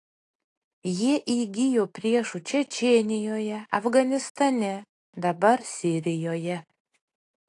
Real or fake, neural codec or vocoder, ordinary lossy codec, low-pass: real; none; AAC, 48 kbps; 10.8 kHz